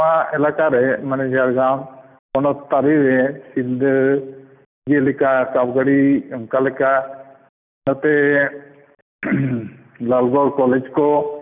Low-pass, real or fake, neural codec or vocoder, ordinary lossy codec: 3.6 kHz; real; none; none